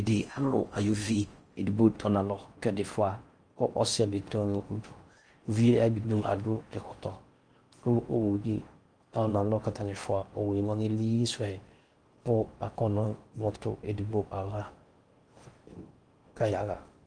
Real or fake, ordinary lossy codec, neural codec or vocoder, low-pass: fake; Opus, 32 kbps; codec, 16 kHz in and 24 kHz out, 0.6 kbps, FocalCodec, streaming, 4096 codes; 9.9 kHz